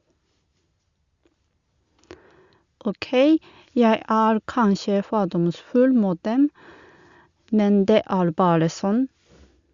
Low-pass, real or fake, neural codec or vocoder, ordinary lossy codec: 7.2 kHz; real; none; Opus, 64 kbps